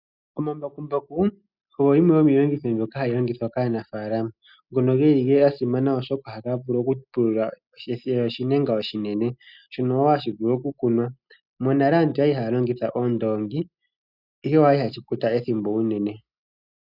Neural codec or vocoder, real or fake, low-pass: none; real; 5.4 kHz